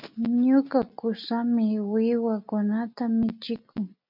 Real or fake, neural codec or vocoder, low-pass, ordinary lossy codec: real; none; 5.4 kHz; MP3, 48 kbps